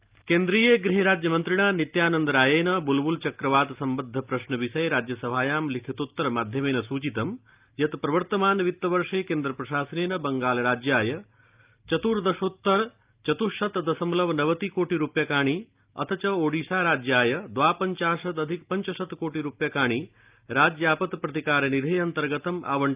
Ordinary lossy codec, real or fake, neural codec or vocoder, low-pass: Opus, 24 kbps; real; none; 3.6 kHz